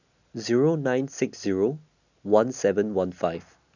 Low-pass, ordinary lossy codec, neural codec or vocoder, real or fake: 7.2 kHz; none; none; real